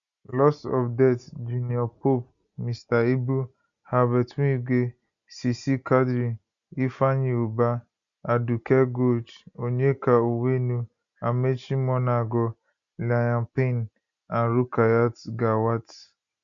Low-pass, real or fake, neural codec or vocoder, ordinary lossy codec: 7.2 kHz; real; none; none